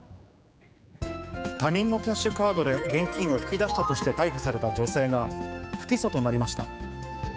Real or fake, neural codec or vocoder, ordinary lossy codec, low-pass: fake; codec, 16 kHz, 4 kbps, X-Codec, HuBERT features, trained on general audio; none; none